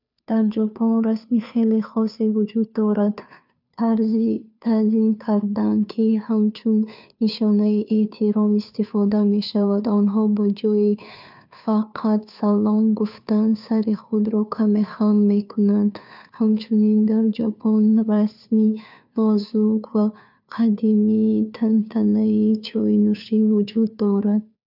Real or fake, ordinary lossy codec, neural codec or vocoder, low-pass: fake; none; codec, 16 kHz, 2 kbps, FunCodec, trained on Chinese and English, 25 frames a second; 5.4 kHz